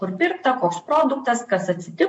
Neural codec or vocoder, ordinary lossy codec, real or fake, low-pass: none; MP3, 48 kbps; real; 9.9 kHz